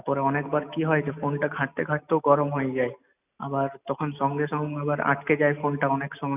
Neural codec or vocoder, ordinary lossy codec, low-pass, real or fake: none; none; 3.6 kHz; real